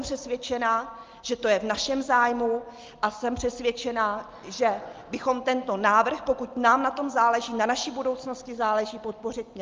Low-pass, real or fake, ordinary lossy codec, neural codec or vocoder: 7.2 kHz; real; Opus, 32 kbps; none